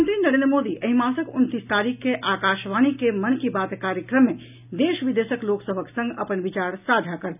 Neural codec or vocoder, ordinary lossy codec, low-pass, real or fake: none; none; 3.6 kHz; real